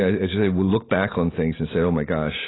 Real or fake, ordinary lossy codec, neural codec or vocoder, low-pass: real; AAC, 16 kbps; none; 7.2 kHz